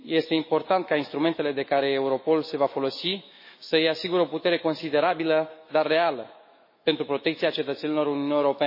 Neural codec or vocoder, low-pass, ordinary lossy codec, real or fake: autoencoder, 48 kHz, 128 numbers a frame, DAC-VAE, trained on Japanese speech; 5.4 kHz; MP3, 24 kbps; fake